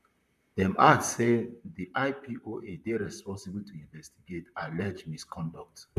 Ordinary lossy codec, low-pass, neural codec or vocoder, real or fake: none; 14.4 kHz; vocoder, 44.1 kHz, 128 mel bands, Pupu-Vocoder; fake